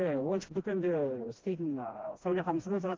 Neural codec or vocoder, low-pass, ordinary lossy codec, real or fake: codec, 16 kHz, 1 kbps, FreqCodec, smaller model; 7.2 kHz; Opus, 16 kbps; fake